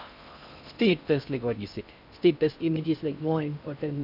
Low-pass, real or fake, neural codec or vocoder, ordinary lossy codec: 5.4 kHz; fake; codec, 16 kHz in and 24 kHz out, 0.6 kbps, FocalCodec, streaming, 4096 codes; none